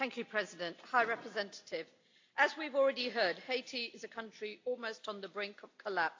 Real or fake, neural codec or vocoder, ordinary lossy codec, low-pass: real; none; AAC, 48 kbps; 7.2 kHz